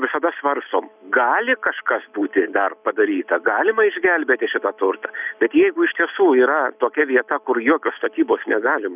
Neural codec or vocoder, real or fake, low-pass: none; real; 3.6 kHz